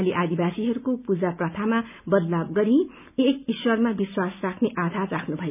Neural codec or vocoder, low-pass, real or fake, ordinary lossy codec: none; 3.6 kHz; real; none